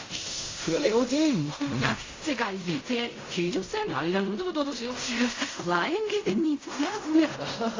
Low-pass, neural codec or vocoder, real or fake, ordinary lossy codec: 7.2 kHz; codec, 16 kHz in and 24 kHz out, 0.4 kbps, LongCat-Audio-Codec, fine tuned four codebook decoder; fake; AAC, 32 kbps